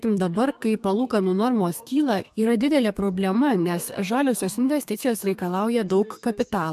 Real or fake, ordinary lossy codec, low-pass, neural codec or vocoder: fake; AAC, 96 kbps; 14.4 kHz; codec, 32 kHz, 1.9 kbps, SNAC